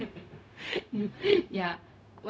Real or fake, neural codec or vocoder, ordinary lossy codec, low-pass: fake; codec, 16 kHz, 0.4 kbps, LongCat-Audio-Codec; none; none